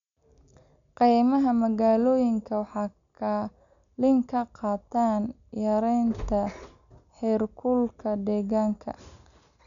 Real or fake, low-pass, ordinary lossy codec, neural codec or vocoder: real; 7.2 kHz; none; none